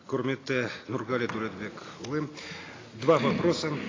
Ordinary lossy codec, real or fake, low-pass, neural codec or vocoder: AAC, 32 kbps; real; 7.2 kHz; none